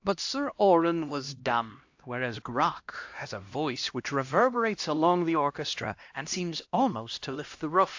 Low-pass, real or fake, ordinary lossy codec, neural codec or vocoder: 7.2 kHz; fake; AAC, 48 kbps; codec, 16 kHz, 1 kbps, X-Codec, HuBERT features, trained on LibriSpeech